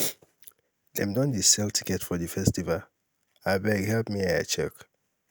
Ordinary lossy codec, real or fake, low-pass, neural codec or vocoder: none; real; none; none